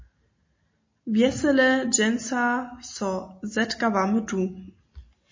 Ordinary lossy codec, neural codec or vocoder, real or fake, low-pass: MP3, 32 kbps; none; real; 7.2 kHz